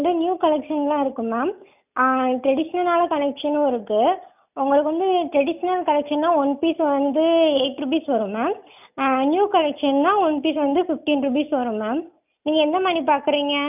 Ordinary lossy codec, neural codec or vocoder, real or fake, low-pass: none; none; real; 3.6 kHz